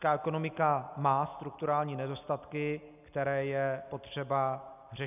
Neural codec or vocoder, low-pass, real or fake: none; 3.6 kHz; real